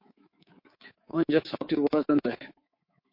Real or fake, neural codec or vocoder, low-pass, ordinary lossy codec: fake; codec, 24 kHz, 3.1 kbps, DualCodec; 5.4 kHz; MP3, 32 kbps